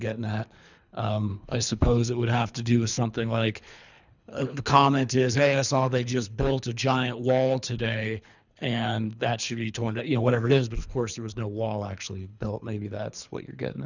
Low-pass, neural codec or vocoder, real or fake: 7.2 kHz; codec, 24 kHz, 3 kbps, HILCodec; fake